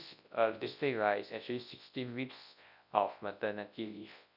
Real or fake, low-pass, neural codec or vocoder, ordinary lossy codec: fake; 5.4 kHz; codec, 24 kHz, 0.9 kbps, WavTokenizer, large speech release; none